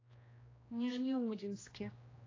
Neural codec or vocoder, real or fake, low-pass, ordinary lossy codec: codec, 16 kHz, 1 kbps, X-Codec, HuBERT features, trained on general audio; fake; 7.2 kHz; AAC, 32 kbps